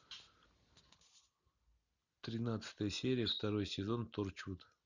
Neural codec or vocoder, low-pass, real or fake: none; 7.2 kHz; real